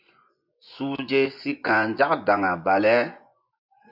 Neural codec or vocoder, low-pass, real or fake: vocoder, 24 kHz, 100 mel bands, Vocos; 5.4 kHz; fake